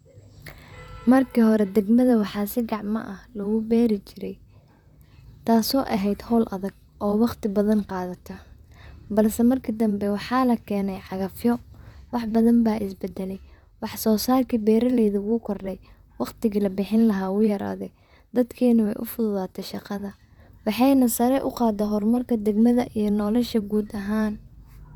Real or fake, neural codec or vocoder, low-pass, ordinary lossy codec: fake; vocoder, 44.1 kHz, 128 mel bands, Pupu-Vocoder; 19.8 kHz; none